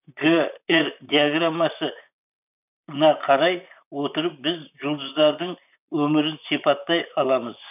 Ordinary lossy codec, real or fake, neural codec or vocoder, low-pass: none; fake; vocoder, 44.1 kHz, 128 mel bands, Pupu-Vocoder; 3.6 kHz